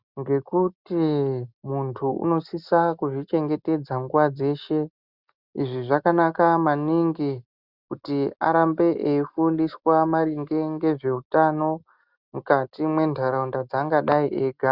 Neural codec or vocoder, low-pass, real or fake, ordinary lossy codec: none; 5.4 kHz; real; Opus, 64 kbps